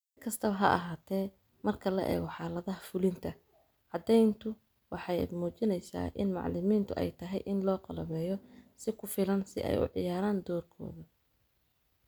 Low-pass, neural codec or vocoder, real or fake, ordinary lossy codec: none; none; real; none